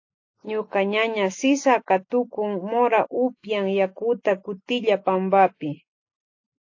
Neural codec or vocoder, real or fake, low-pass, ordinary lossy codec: none; real; 7.2 kHz; AAC, 48 kbps